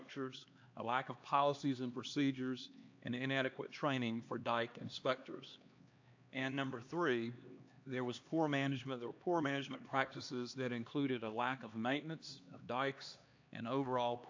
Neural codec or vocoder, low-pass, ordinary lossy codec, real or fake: codec, 16 kHz, 2 kbps, X-Codec, HuBERT features, trained on LibriSpeech; 7.2 kHz; AAC, 48 kbps; fake